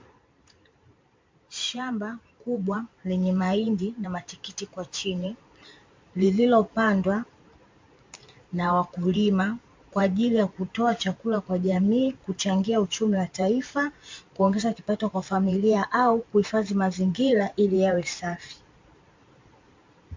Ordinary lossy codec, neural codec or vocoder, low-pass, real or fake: MP3, 48 kbps; vocoder, 44.1 kHz, 128 mel bands every 512 samples, BigVGAN v2; 7.2 kHz; fake